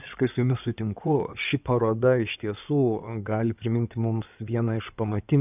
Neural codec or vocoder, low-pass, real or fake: codec, 16 kHz in and 24 kHz out, 2.2 kbps, FireRedTTS-2 codec; 3.6 kHz; fake